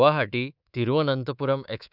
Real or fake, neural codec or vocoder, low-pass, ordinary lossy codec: fake; codec, 24 kHz, 3.1 kbps, DualCodec; 5.4 kHz; none